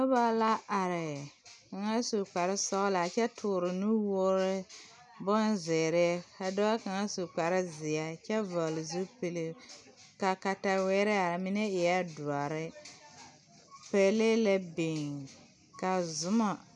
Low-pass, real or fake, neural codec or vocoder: 10.8 kHz; real; none